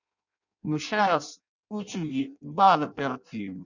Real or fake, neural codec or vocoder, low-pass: fake; codec, 16 kHz in and 24 kHz out, 0.6 kbps, FireRedTTS-2 codec; 7.2 kHz